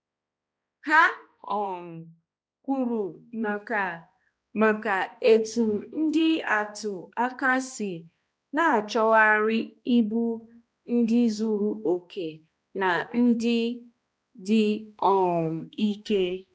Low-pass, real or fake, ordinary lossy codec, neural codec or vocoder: none; fake; none; codec, 16 kHz, 1 kbps, X-Codec, HuBERT features, trained on balanced general audio